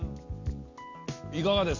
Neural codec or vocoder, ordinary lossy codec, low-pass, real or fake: none; Opus, 64 kbps; 7.2 kHz; real